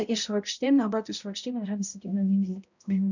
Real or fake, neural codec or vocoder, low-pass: fake; codec, 16 kHz, 0.5 kbps, X-Codec, HuBERT features, trained on balanced general audio; 7.2 kHz